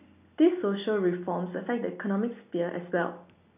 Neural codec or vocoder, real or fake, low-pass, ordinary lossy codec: none; real; 3.6 kHz; none